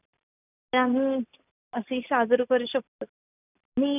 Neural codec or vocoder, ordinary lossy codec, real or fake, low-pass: none; none; real; 3.6 kHz